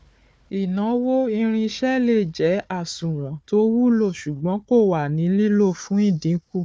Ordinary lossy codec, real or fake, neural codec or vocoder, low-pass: none; fake; codec, 16 kHz, 4 kbps, FunCodec, trained on Chinese and English, 50 frames a second; none